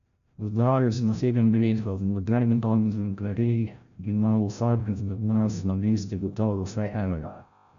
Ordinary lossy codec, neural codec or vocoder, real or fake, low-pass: AAC, 96 kbps; codec, 16 kHz, 0.5 kbps, FreqCodec, larger model; fake; 7.2 kHz